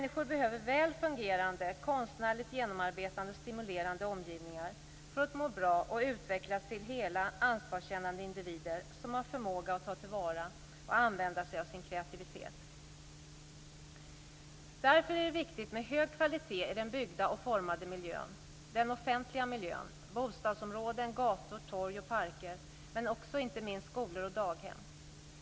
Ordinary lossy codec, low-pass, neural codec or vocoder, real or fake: none; none; none; real